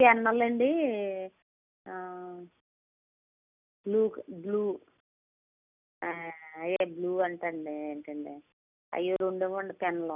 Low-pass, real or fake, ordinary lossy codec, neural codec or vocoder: 3.6 kHz; real; none; none